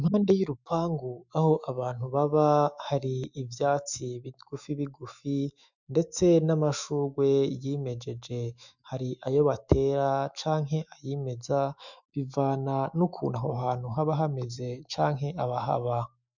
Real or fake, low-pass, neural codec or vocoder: fake; 7.2 kHz; autoencoder, 48 kHz, 128 numbers a frame, DAC-VAE, trained on Japanese speech